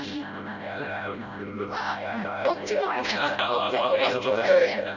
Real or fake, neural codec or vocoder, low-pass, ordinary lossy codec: fake; codec, 16 kHz, 0.5 kbps, FreqCodec, smaller model; 7.2 kHz; none